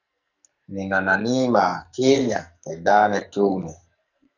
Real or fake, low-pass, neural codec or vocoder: fake; 7.2 kHz; codec, 44.1 kHz, 2.6 kbps, SNAC